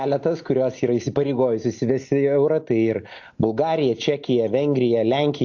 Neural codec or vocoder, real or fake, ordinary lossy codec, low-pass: none; real; AAC, 48 kbps; 7.2 kHz